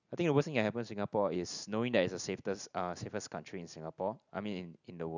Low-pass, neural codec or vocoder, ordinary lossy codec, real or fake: 7.2 kHz; none; none; real